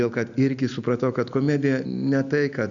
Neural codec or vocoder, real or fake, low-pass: none; real; 7.2 kHz